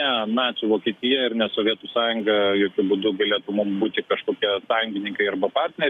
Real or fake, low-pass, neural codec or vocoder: fake; 14.4 kHz; codec, 44.1 kHz, 7.8 kbps, DAC